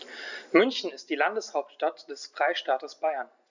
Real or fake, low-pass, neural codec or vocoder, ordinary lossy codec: real; 7.2 kHz; none; none